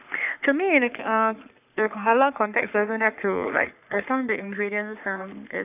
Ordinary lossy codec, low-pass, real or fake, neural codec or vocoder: none; 3.6 kHz; fake; codec, 44.1 kHz, 3.4 kbps, Pupu-Codec